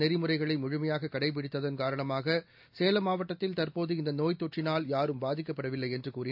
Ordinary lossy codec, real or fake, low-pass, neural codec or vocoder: AAC, 48 kbps; real; 5.4 kHz; none